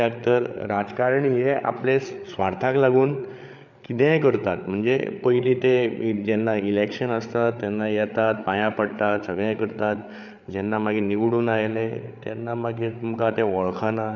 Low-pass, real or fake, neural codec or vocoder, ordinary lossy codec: 7.2 kHz; fake; codec, 16 kHz, 8 kbps, FreqCodec, larger model; none